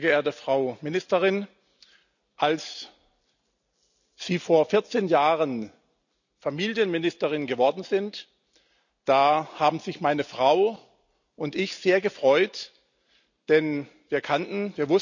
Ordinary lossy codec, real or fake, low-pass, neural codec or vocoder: none; real; 7.2 kHz; none